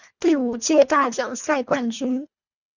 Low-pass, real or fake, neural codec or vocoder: 7.2 kHz; fake; codec, 24 kHz, 1.5 kbps, HILCodec